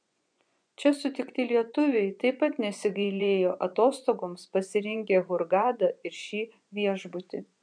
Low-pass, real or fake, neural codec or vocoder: 9.9 kHz; fake; vocoder, 24 kHz, 100 mel bands, Vocos